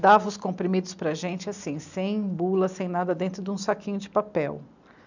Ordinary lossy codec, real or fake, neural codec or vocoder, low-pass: none; real; none; 7.2 kHz